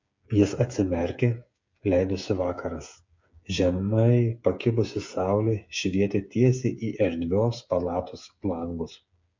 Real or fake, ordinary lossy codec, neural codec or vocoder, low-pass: fake; MP3, 48 kbps; codec, 16 kHz, 8 kbps, FreqCodec, smaller model; 7.2 kHz